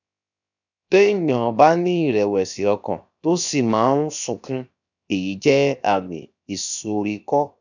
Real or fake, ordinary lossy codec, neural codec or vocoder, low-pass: fake; none; codec, 16 kHz, 0.7 kbps, FocalCodec; 7.2 kHz